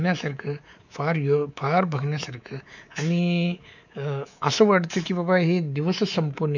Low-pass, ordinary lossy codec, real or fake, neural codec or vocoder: 7.2 kHz; none; fake; codec, 24 kHz, 3.1 kbps, DualCodec